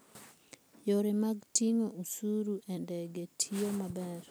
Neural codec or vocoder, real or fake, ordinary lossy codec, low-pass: none; real; none; none